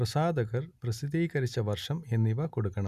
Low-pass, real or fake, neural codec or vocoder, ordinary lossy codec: 14.4 kHz; real; none; none